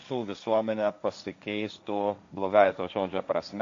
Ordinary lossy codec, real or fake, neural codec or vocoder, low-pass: MP3, 48 kbps; fake; codec, 16 kHz, 1.1 kbps, Voila-Tokenizer; 7.2 kHz